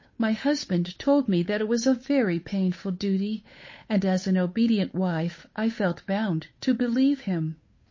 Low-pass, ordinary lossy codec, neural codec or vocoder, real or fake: 7.2 kHz; MP3, 32 kbps; codec, 16 kHz, 8 kbps, FunCodec, trained on Chinese and English, 25 frames a second; fake